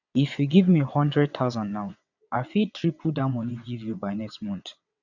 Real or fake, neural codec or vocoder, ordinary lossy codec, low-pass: fake; vocoder, 22.05 kHz, 80 mel bands, WaveNeXt; none; 7.2 kHz